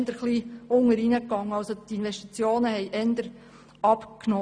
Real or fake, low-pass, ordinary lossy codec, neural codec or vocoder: real; none; none; none